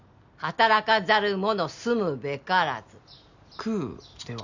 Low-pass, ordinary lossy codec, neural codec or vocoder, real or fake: 7.2 kHz; none; none; real